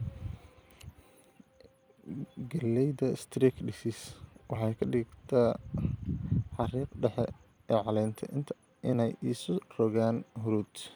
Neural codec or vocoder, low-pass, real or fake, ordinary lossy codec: vocoder, 44.1 kHz, 128 mel bands every 256 samples, BigVGAN v2; none; fake; none